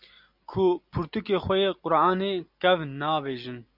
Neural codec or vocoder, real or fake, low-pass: none; real; 5.4 kHz